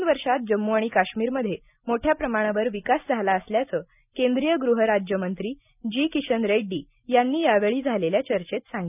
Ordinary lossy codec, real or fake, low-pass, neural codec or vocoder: none; real; 3.6 kHz; none